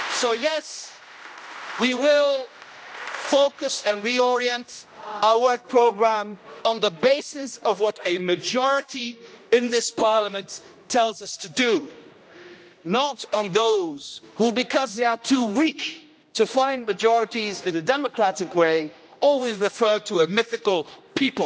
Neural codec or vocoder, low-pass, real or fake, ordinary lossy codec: codec, 16 kHz, 1 kbps, X-Codec, HuBERT features, trained on general audio; none; fake; none